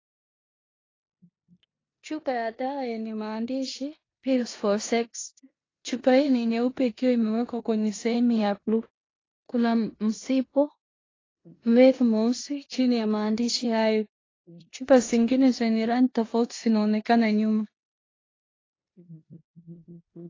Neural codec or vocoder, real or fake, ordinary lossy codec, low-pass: codec, 16 kHz in and 24 kHz out, 0.9 kbps, LongCat-Audio-Codec, four codebook decoder; fake; AAC, 32 kbps; 7.2 kHz